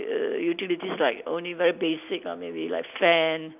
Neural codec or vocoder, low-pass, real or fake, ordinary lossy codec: none; 3.6 kHz; real; none